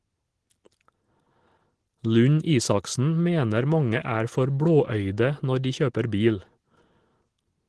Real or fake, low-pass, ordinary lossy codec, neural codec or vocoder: real; 10.8 kHz; Opus, 16 kbps; none